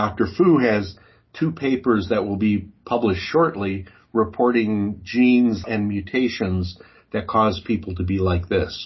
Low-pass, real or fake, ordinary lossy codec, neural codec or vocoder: 7.2 kHz; real; MP3, 24 kbps; none